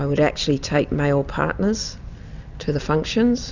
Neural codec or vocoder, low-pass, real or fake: none; 7.2 kHz; real